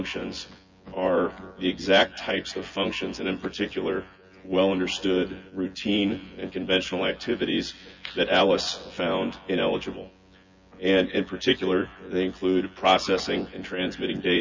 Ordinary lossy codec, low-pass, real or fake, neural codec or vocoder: MP3, 64 kbps; 7.2 kHz; fake; vocoder, 24 kHz, 100 mel bands, Vocos